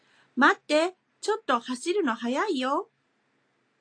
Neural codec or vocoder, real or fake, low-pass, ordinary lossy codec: none; real; 9.9 kHz; AAC, 48 kbps